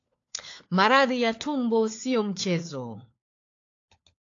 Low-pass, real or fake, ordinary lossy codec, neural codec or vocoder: 7.2 kHz; fake; AAC, 48 kbps; codec, 16 kHz, 4 kbps, FunCodec, trained on LibriTTS, 50 frames a second